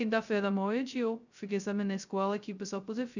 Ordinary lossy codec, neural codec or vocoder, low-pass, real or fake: none; codec, 16 kHz, 0.2 kbps, FocalCodec; 7.2 kHz; fake